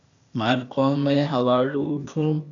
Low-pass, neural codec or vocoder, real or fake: 7.2 kHz; codec, 16 kHz, 0.8 kbps, ZipCodec; fake